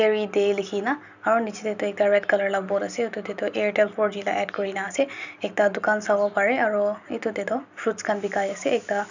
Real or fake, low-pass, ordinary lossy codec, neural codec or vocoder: real; 7.2 kHz; none; none